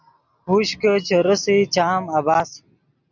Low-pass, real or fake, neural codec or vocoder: 7.2 kHz; real; none